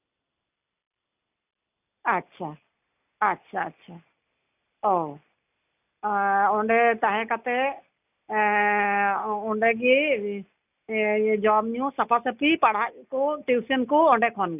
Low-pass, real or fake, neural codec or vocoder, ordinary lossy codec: 3.6 kHz; real; none; none